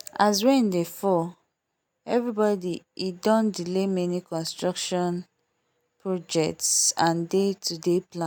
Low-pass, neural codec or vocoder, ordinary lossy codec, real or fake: none; none; none; real